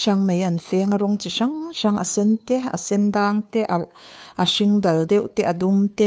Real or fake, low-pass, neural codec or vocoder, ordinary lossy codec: fake; none; codec, 16 kHz, 2 kbps, FunCodec, trained on Chinese and English, 25 frames a second; none